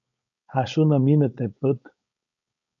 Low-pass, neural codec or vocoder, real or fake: 7.2 kHz; codec, 16 kHz, 4.8 kbps, FACodec; fake